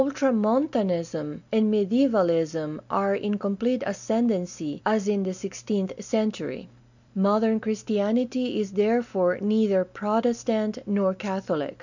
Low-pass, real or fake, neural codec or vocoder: 7.2 kHz; real; none